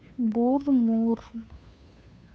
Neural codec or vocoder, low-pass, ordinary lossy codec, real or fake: codec, 16 kHz, 2 kbps, FunCodec, trained on Chinese and English, 25 frames a second; none; none; fake